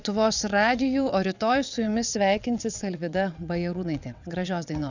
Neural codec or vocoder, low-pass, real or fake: vocoder, 22.05 kHz, 80 mel bands, WaveNeXt; 7.2 kHz; fake